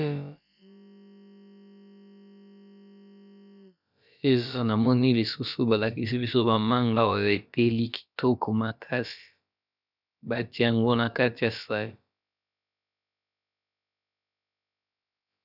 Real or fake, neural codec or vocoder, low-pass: fake; codec, 16 kHz, about 1 kbps, DyCAST, with the encoder's durations; 5.4 kHz